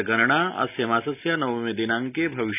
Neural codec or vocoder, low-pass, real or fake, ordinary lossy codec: none; 3.6 kHz; real; none